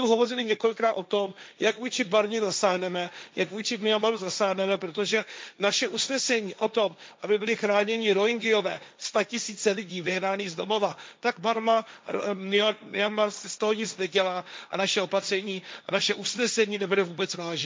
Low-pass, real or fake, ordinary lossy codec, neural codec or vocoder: none; fake; none; codec, 16 kHz, 1.1 kbps, Voila-Tokenizer